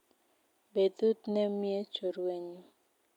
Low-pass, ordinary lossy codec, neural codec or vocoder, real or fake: 19.8 kHz; none; none; real